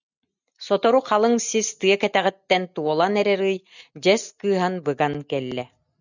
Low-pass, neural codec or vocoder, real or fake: 7.2 kHz; none; real